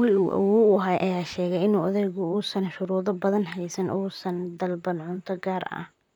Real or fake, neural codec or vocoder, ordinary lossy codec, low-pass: fake; vocoder, 44.1 kHz, 128 mel bands, Pupu-Vocoder; none; 19.8 kHz